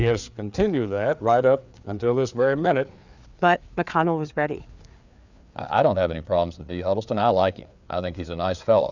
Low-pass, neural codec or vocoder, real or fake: 7.2 kHz; codec, 16 kHz, 4 kbps, FreqCodec, larger model; fake